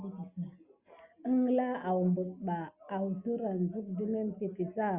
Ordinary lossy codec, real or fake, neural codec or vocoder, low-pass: Opus, 64 kbps; real; none; 3.6 kHz